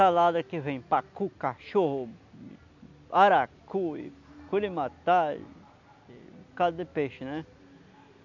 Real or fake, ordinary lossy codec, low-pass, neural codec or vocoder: real; none; 7.2 kHz; none